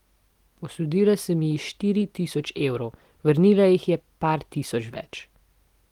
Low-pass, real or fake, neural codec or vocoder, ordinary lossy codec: 19.8 kHz; real; none; Opus, 24 kbps